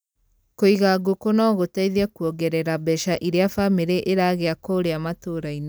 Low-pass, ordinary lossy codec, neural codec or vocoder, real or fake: none; none; none; real